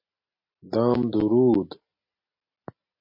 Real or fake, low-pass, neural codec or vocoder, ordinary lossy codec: real; 5.4 kHz; none; MP3, 32 kbps